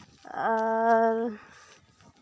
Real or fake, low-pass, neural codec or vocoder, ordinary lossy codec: real; none; none; none